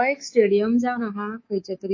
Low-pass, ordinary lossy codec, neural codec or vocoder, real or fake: 7.2 kHz; MP3, 32 kbps; codec, 24 kHz, 3.1 kbps, DualCodec; fake